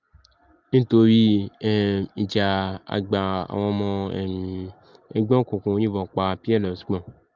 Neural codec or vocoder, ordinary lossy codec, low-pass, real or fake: none; Opus, 32 kbps; 7.2 kHz; real